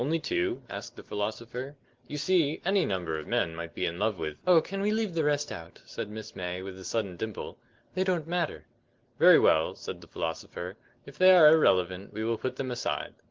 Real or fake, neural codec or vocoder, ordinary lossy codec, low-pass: real; none; Opus, 16 kbps; 7.2 kHz